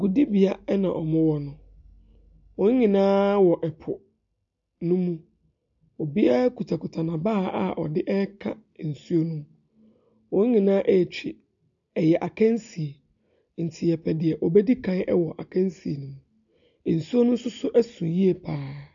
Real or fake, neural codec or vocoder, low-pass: real; none; 7.2 kHz